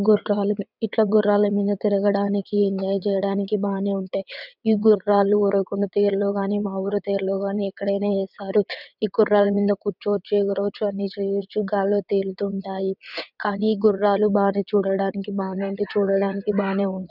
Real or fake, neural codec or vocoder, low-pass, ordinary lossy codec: fake; vocoder, 22.05 kHz, 80 mel bands, WaveNeXt; 5.4 kHz; none